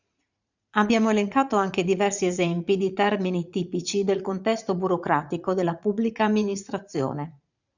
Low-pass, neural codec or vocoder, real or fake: 7.2 kHz; vocoder, 44.1 kHz, 80 mel bands, Vocos; fake